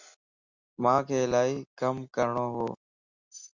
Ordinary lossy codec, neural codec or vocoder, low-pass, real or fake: Opus, 64 kbps; none; 7.2 kHz; real